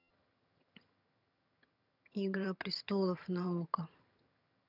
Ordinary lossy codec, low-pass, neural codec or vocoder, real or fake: none; 5.4 kHz; vocoder, 22.05 kHz, 80 mel bands, HiFi-GAN; fake